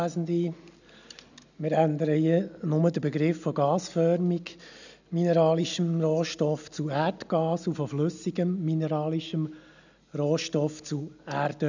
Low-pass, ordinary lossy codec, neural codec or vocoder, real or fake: 7.2 kHz; none; none; real